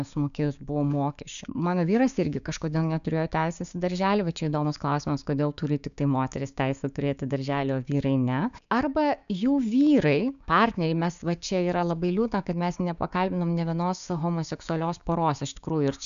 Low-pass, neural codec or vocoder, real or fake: 7.2 kHz; codec, 16 kHz, 6 kbps, DAC; fake